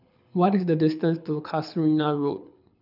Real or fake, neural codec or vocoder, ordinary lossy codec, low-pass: fake; codec, 24 kHz, 6 kbps, HILCodec; none; 5.4 kHz